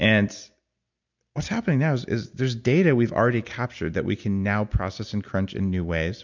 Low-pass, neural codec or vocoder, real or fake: 7.2 kHz; none; real